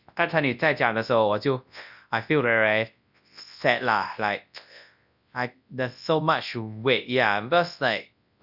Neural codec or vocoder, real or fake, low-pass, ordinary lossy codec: codec, 24 kHz, 0.9 kbps, WavTokenizer, large speech release; fake; 5.4 kHz; none